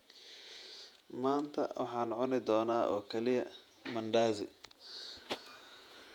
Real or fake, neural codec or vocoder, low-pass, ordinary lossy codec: fake; vocoder, 48 kHz, 128 mel bands, Vocos; 19.8 kHz; none